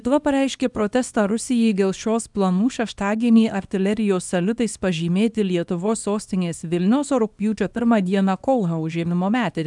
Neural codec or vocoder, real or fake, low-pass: codec, 24 kHz, 0.9 kbps, WavTokenizer, small release; fake; 10.8 kHz